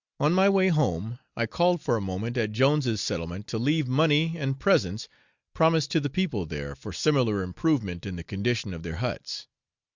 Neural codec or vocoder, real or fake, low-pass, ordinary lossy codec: none; real; 7.2 kHz; Opus, 64 kbps